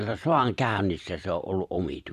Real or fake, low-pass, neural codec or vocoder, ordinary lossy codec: fake; 14.4 kHz; vocoder, 44.1 kHz, 128 mel bands every 512 samples, BigVGAN v2; none